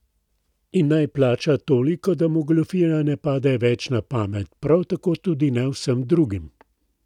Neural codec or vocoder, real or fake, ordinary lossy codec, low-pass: none; real; none; 19.8 kHz